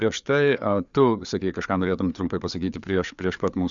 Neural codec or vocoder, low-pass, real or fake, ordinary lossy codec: codec, 16 kHz, 4 kbps, FreqCodec, larger model; 7.2 kHz; fake; MP3, 96 kbps